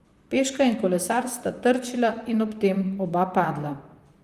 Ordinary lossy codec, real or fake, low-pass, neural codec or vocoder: Opus, 32 kbps; fake; 14.4 kHz; vocoder, 44.1 kHz, 128 mel bands, Pupu-Vocoder